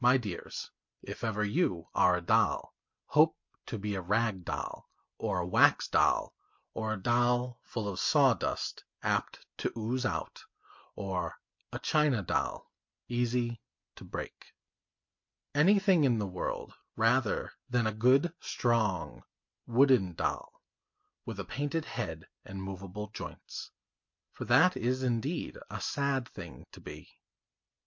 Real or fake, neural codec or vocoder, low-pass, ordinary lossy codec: real; none; 7.2 kHz; MP3, 48 kbps